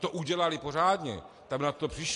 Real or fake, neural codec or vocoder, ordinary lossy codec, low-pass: real; none; AAC, 48 kbps; 10.8 kHz